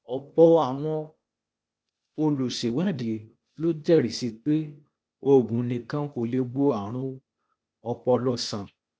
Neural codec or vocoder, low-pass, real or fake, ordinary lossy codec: codec, 16 kHz, 0.8 kbps, ZipCodec; none; fake; none